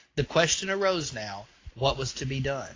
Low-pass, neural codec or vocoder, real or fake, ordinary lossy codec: 7.2 kHz; none; real; AAC, 32 kbps